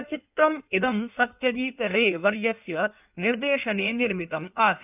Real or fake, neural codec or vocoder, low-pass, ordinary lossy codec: fake; codec, 16 kHz in and 24 kHz out, 1.1 kbps, FireRedTTS-2 codec; 3.6 kHz; none